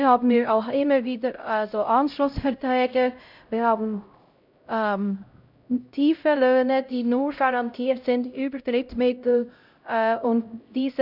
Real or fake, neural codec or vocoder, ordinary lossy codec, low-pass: fake; codec, 16 kHz, 0.5 kbps, X-Codec, HuBERT features, trained on LibriSpeech; none; 5.4 kHz